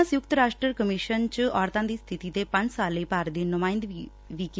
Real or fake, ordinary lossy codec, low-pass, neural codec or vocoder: real; none; none; none